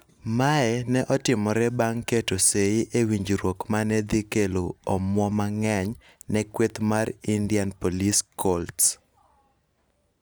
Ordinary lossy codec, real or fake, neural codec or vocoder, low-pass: none; real; none; none